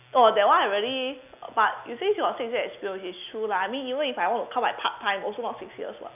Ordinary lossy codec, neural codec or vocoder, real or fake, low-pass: none; none; real; 3.6 kHz